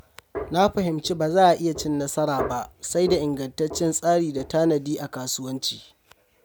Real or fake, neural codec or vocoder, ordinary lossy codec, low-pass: real; none; none; none